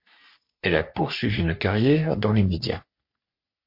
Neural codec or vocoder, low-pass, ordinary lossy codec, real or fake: codec, 44.1 kHz, 3.4 kbps, Pupu-Codec; 5.4 kHz; MP3, 32 kbps; fake